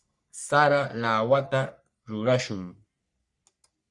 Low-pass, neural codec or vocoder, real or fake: 10.8 kHz; codec, 44.1 kHz, 3.4 kbps, Pupu-Codec; fake